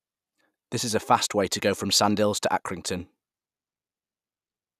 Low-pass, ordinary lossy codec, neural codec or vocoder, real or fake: 14.4 kHz; none; none; real